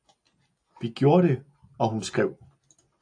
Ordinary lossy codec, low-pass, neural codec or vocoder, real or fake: AAC, 64 kbps; 9.9 kHz; none; real